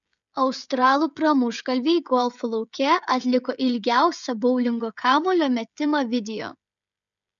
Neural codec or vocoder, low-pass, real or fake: codec, 16 kHz, 16 kbps, FreqCodec, smaller model; 7.2 kHz; fake